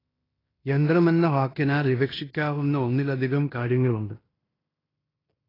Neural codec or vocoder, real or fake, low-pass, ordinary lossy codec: codec, 16 kHz in and 24 kHz out, 0.9 kbps, LongCat-Audio-Codec, fine tuned four codebook decoder; fake; 5.4 kHz; AAC, 24 kbps